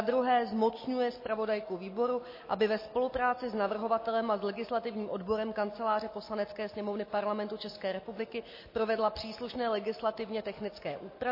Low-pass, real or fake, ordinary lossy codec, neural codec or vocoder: 5.4 kHz; real; MP3, 24 kbps; none